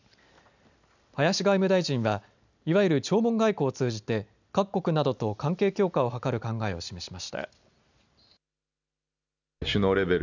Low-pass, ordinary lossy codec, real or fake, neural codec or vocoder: 7.2 kHz; none; real; none